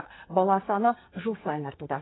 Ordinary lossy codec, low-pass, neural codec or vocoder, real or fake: AAC, 16 kbps; 7.2 kHz; codec, 44.1 kHz, 2.6 kbps, SNAC; fake